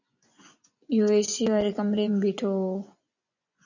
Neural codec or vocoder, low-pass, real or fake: vocoder, 24 kHz, 100 mel bands, Vocos; 7.2 kHz; fake